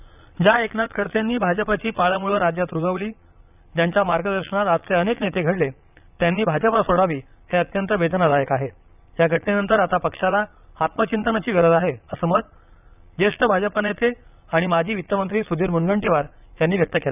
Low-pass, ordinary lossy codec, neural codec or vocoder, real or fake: 3.6 kHz; none; vocoder, 22.05 kHz, 80 mel bands, Vocos; fake